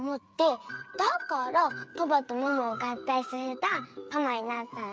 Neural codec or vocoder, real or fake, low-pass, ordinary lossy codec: codec, 16 kHz, 8 kbps, FreqCodec, smaller model; fake; none; none